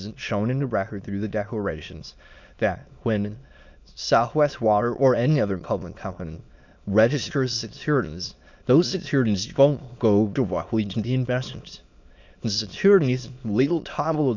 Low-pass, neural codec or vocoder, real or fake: 7.2 kHz; autoencoder, 22.05 kHz, a latent of 192 numbers a frame, VITS, trained on many speakers; fake